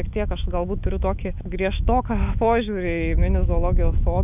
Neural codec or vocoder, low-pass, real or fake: autoencoder, 48 kHz, 128 numbers a frame, DAC-VAE, trained on Japanese speech; 3.6 kHz; fake